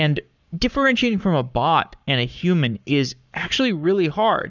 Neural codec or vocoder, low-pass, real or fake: codec, 44.1 kHz, 7.8 kbps, Pupu-Codec; 7.2 kHz; fake